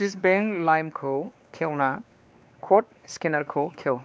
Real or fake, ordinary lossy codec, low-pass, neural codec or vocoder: fake; none; none; codec, 16 kHz, 2 kbps, X-Codec, WavLM features, trained on Multilingual LibriSpeech